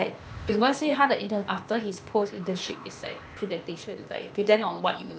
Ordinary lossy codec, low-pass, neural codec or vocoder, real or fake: none; none; codec, 16 kHz, 0.8 kbps, ZipCodec; fake